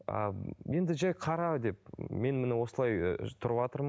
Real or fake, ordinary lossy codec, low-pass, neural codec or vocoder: real; none; none; none